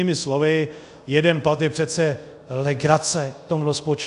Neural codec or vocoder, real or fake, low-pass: codec, 24 kHz, 0.5 kbps, DualCodec; fake; 10.8 kHz